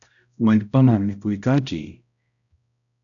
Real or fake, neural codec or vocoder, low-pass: fake; codec, 16 kHz, 0.5 kbps, X-Codec, HuBERT features, trained on balanced general audio; 7.2 kHz